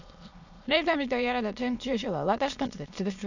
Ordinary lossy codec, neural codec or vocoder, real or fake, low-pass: none; autoencoder, 22.05 kHz, a latent of 192 numbers a frame, VITS, trained on many speakers; fake; 7.2 kHz